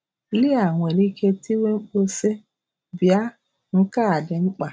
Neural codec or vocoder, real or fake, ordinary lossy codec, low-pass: none; real; none; none